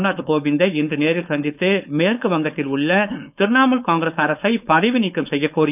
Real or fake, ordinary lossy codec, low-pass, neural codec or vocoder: fake; none; 3.6 kHz; codec, 16 kHz, 4.8 kbps, FACodec